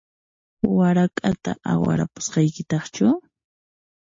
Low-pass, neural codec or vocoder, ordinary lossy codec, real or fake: 7.2 kHz; none; MP3, 32 kbps; real